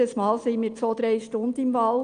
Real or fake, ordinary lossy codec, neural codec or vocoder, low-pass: real; Opus, 32 kbps; none; 9.9 kHz